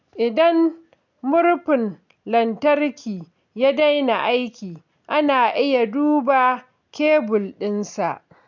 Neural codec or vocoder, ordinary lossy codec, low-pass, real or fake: none; none; 7.2 kHz; real